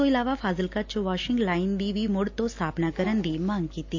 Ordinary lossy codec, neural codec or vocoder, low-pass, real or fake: AAC, 48 kbps; none; 7.2 kHz; real